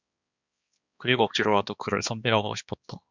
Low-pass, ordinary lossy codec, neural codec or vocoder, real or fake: 7.2 kHz; Opus, 64 kbps; codec, 16 kHz, 2 kbps, X-Codec, HuBERT features, trained on balanced general audio; fake